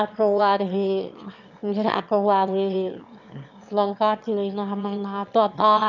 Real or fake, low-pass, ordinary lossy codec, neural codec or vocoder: fake; 7.2 kHz; none; autoencoder, 22.05 kHz, a latent of 192 numbers a frame, VITS, trained on one speaker